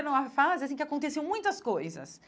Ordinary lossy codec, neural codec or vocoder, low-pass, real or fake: none; none; none; real